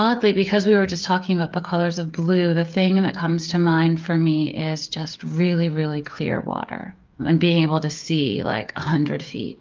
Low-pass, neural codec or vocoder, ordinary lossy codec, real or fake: 7.2 kHz; codec, 16 kHz, 8 kbps, FreqCodec, smaller model; Opus, 24 kbps; fake